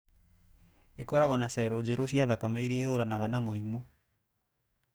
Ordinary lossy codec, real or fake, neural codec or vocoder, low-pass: none; fake; codec, 44.1 kHz, 2.6 kbps, DAC; none